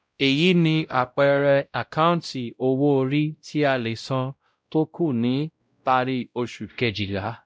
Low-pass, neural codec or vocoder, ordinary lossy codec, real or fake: none; codec, 16 kHz, 0.5 kbps, X-Codec, WavLM features, trained on Multilingual LibriSpeech; none; fake